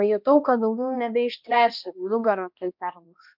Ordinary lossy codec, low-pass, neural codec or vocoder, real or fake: MP3, 48 kbps; 5.4 kHz; codec, 16 kHz, 1 kbps, X-Codec, HuBERT features, trained on balanced general audio; fake